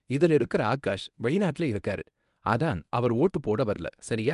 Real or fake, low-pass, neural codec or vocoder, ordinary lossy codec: fake; 10.8 kHz; codec, 24 kHz, 0.9 kbps, WavTokenizer, medium speech release version 1; none